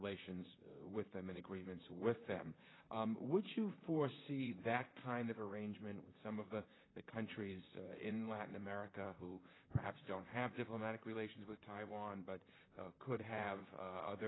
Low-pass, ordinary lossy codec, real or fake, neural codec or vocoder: 7.2 kHz; AAC, 16 kbps; fake; vocoder, 44.1 kHz, 128 mel bands, Pupu-Vocoder